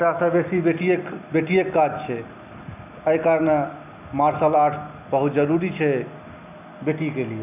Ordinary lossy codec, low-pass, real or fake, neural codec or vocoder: none; 3.6 kHz; real; none